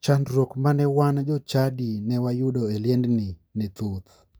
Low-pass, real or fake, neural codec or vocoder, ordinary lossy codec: none; real; none; none